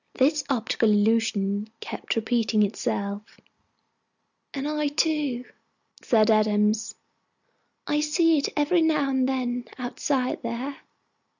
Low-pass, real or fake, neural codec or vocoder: 7.2 kHz; real; none